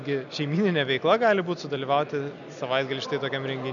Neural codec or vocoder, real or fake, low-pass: none; real; 7.2 kHz